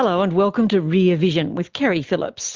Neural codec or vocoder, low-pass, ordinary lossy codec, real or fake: none; 7.2 kHz; Opus, 32 kbps; real